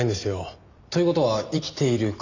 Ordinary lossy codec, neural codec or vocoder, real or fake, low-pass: none; none; real; 7.2 kHz